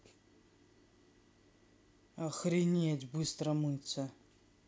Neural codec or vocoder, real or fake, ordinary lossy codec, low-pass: none; real; none; none